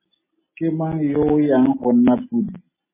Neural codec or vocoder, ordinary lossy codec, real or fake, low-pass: none; MP3, 16 kbps; real; 3.6 kHz